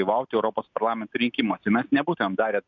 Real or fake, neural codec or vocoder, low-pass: real; none; 7.2 kHz